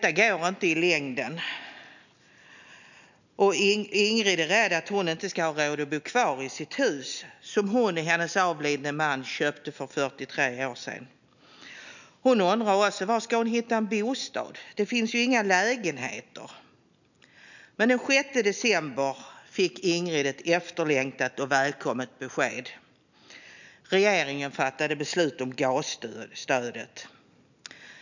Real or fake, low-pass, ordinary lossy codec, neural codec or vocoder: real; 7.2 kHz; none; none